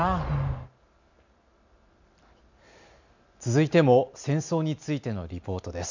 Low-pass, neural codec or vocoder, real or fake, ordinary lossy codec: 7.2 kHz; none; real; none